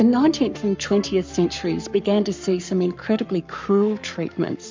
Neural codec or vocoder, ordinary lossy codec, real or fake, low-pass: codec, 44.1 kHz, 7.8 kbps, Pupu-Codec; MP3, 64 kbps; fake; 7.2 kHz